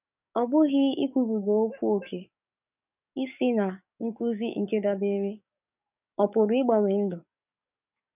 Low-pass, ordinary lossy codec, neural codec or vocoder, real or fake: 3.6 kHz; none; codec, 44.1 kHz, 7.8 kbps, DAC; fake